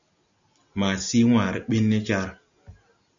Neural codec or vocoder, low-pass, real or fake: none; 7.2 kHz; real